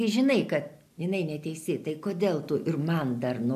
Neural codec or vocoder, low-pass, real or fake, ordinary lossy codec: none; 14.4 kHz; real; AAC, 96 kbps